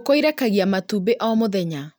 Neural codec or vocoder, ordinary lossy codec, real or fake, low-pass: none; none; real; none